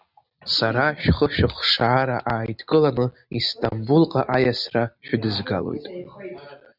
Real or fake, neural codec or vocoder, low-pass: real; none; 5.4 kHz